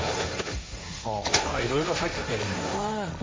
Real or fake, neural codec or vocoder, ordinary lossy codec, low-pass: fake; codec, 16 kHz, 1.1 kbps, Voila-Tokenizer; none; none